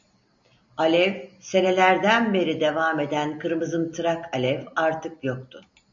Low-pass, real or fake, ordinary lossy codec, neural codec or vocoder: 7.2 kHz; real; AAC, 64 kbps; none